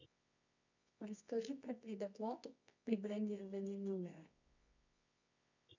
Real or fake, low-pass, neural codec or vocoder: fake; 7.2 kHz; codec, 24 kHz, 0.9 kbps, WavTokenizer, medium music audio release